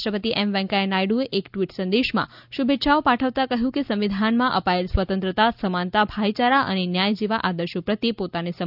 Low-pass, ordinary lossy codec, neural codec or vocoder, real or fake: 5.4 kHz; none; none; real